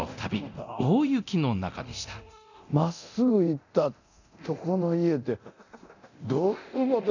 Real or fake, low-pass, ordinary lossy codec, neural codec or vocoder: fake; 7.2 kHz; none; codec, 24 kHz, 0.9 kbps, DualCodec